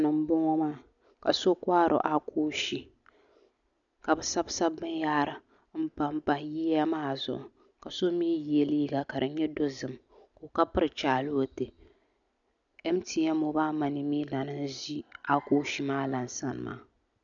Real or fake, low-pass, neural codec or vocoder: real; 7.2 kHz; none